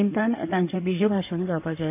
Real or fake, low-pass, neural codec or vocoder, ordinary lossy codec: fake; 3.6 kHz; codec, 16 kHz in and 24 kHz out, 2.2 kbps, FireRedTTS-2 codec; AAC, 24 kbps